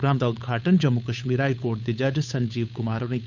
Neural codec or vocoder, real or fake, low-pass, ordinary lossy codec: codec, 16 kHz, 8 kbps, FunCodec, trained on Chinese and English, 25 frames a second; fake; 7.2 kHz; none